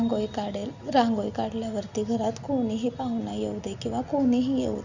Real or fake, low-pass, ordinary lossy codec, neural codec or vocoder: real; 7.2 kHz; none; none